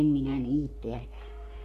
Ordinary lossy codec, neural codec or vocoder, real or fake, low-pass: none; codec, 44.1 kHz, 3.4 kbps, Pupu-Codec; fake; 14.4 kHz